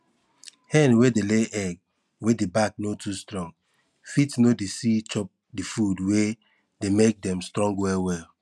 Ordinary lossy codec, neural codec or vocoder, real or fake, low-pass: none; none; real; none